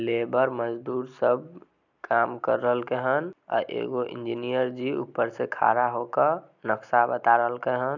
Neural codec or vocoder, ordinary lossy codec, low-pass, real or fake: none; none; 7.2 kHz; real